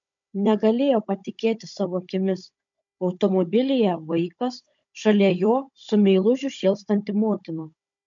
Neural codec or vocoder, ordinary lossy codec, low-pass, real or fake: codec, 16 kHz, 16 kbps, FunCodec, trained on Chinese and English, 50 frames a second; MP3, 64 kbps; 7.2 kHz; fake